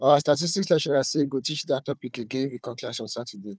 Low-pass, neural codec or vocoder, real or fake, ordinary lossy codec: none; codec, 16 kHz, 4 kbps, FunCodec, trained on Chinese and English, 50 frames a second; fake; none